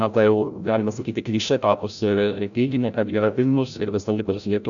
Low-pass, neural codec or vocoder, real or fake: 7.2 kHz; codec, 16 kHz, 0.5 kbps, FreqCodec, larger model; fake